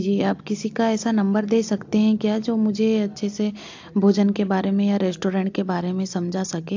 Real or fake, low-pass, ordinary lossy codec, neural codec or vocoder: real; 7.2 kHz; AAC, 48 kbps; none